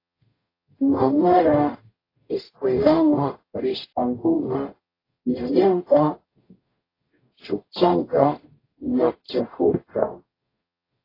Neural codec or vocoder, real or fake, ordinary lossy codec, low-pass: codec, 44.1 kHz, 0.9 kbps, DAC; fake; AAC, 24 kbps; 5.4 kHz